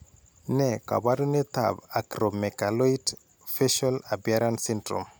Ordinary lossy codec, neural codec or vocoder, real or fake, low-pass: none; none; real; none